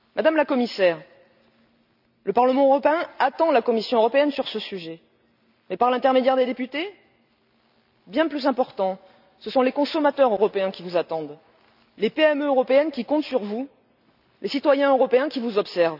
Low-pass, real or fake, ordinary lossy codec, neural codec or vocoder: 5.4 kHz; real; none; none